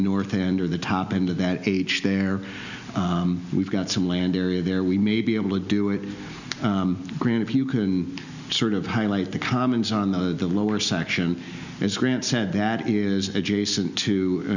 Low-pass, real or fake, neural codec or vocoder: 7.2 kHz; real; none